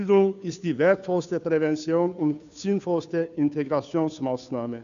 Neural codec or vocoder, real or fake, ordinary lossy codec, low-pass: codec, 16 kHz, 2 kbps, FunCodec, trained on Chinese and English, 25 frames a second; fake; none; 7.2 kHz